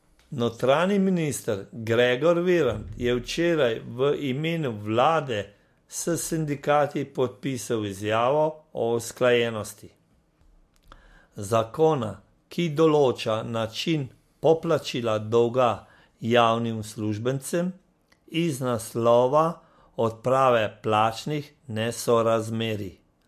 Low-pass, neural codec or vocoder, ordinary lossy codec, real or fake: 14.4 kHz; none; MP3, 64 kbps; real